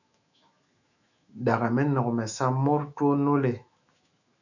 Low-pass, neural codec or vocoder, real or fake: 7.2 kHz; autoencoder, 48 kHz, 128 numbers a frame, DAC-VAE, trained on Japanese speech; fake